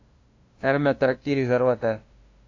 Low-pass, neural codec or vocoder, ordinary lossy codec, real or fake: 7.2 kHz; codec, 16 kHz, 0.5 kbps, FunCodec, trained on LibriTTS, 25 frames a second; AAC, 48 kbps; fake